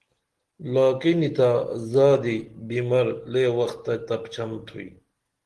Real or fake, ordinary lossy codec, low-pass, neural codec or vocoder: real; Opus, 16 kbps; 10.8 kHz; none